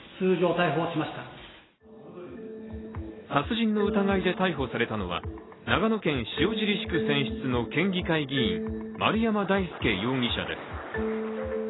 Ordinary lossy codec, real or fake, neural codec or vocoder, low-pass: AAC, 16 kbps; real; none; 7.2 kHz